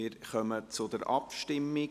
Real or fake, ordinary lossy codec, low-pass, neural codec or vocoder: real; none; 14.4 kHz; none